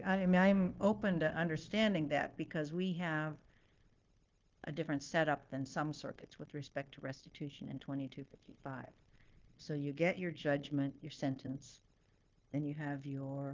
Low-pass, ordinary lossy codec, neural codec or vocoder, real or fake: 7.2 kHz; Opus, 32 kbps; codec, 16 kHz, 0.9 kbps, LongCat-Audio-Codec; fake